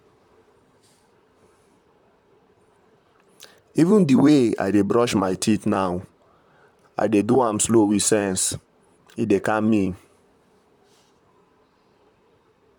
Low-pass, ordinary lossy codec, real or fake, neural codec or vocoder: 19.8 kHz; none; fake; vocoder, 44.1 kHz, 128 mel bands, Pupu-Vocoder